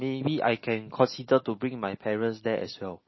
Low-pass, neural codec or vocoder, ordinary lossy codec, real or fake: 7.2 kHz; none; MP3, 24 kbps; real